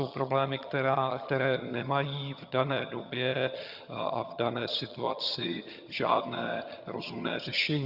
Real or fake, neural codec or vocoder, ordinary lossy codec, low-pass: fake; vocoder, 22.05 kHz, 80 mel bands, HiFi-GAN; Opus, 64 kbps; 5.4 kHz